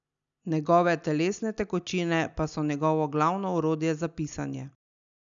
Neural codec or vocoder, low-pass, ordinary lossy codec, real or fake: none; 7.2 kHz; none; real